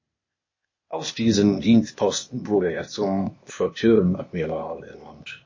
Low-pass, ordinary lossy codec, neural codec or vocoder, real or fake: 7.2 kHz; MP3, 32 kbps; codec, 16 kHz, 0.8 kbps, ZipCodec; fake